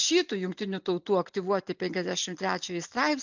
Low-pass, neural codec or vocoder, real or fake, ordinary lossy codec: 7.2 kHz; none; real; MP3, 64 kbps